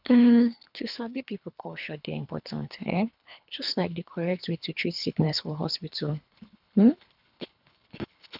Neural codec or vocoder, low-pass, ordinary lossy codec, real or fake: codec, 24 kHz, 3 kbps, HILCodec; 5.4 kHz; none; fake